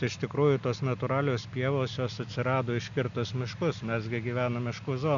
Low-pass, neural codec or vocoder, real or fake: 7.2 kHz; none; real